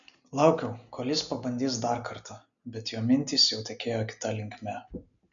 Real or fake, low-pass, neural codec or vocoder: real; 7.2 kHz; none